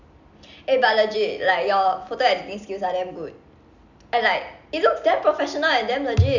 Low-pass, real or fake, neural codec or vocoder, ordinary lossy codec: 7.2 kHz; real; none; none